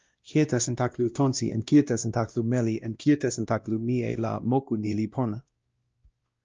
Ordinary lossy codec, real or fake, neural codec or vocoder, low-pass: Opus, 24 kbps; fake; codec, 16 kHz, 1 kbps, X-Codec, WavLM features, trained on Multilingual LibriSpeech; 7.2 kHz